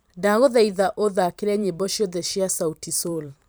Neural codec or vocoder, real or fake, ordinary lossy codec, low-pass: vocoder, 44.1 kHz, 128 mel bands every 512 samples, BigVGAN v2; fake; none; none